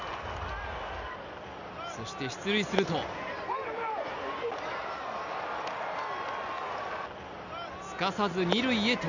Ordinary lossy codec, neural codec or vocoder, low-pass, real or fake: none; none; 7.2 kHz; real